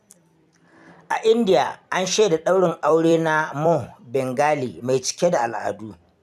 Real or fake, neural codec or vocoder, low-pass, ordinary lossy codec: fake; vocoder, 44.1 kHz, 128 mel bands every 256 samples, BigVGAN v2; 14.4 kHz; none